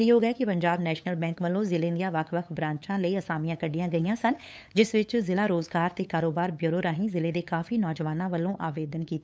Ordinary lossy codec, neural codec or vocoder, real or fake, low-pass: none; codec, 16 kHz, 8 kbps, FunCodec, trained on LibriTTS, 25 frames a second; fake; none